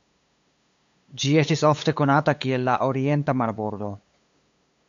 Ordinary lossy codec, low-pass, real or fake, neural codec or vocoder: AAC, 64 kbps; 7.2 kHz; fake; codec, 16 kHz, 8 kbps, FunCodec, trained on LibriTTS, 25 frames a second